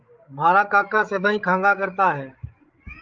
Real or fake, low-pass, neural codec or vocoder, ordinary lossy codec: fake; 7.2 kHz; codec, 16 kHz, 16 kbps, FreqCodec, larger model; Opus, 24 kbps